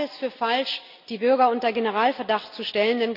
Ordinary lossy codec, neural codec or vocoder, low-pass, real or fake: none; none; 5.4 kHz; real